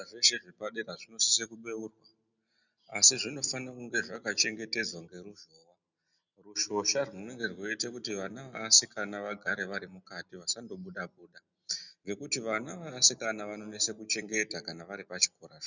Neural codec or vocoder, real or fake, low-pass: none; real; 7.2 kHz